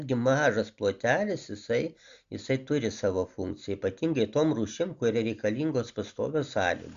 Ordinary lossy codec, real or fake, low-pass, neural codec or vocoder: Opus, 64 kbps; real; 7.2 kHz; none